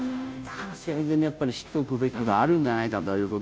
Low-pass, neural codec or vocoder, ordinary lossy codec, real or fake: none; codec, 16 kHz, 0.5 kbps, FunCodec, trained on Chinese and English, 25 frames a second; none; fake